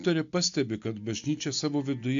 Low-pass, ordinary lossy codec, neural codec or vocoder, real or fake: 7.2 kHz; AAC, 64 kbps; none; real